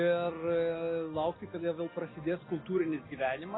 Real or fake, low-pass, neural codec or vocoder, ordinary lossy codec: real; 7.2 kHz; none; AAC, 16 kbps